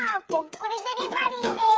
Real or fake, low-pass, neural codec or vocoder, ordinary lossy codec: fake; none; codec, 16 kHz, 4 kbps, FreqCodec, smaller model; none